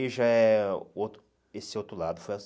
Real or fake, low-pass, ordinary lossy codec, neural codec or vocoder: real; none; none; none